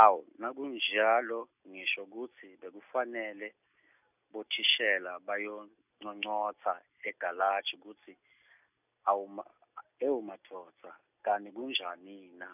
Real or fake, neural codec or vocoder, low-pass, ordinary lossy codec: real; none; 3.6 kHz; none